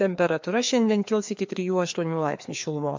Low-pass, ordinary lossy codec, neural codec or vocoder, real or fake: 7.2 kHz; MP3, 64 kbps; codec, 16 kHz, 2 kbps, FreqCodec, larger model; fake